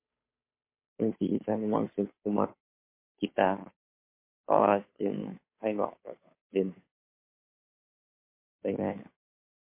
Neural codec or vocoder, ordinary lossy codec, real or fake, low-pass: codec, 16 kHz, 2 kbps, FunCodec, trained on Chinese and English, 25 frames a second; MP3, 24 kbps; fake; 3.6 kHz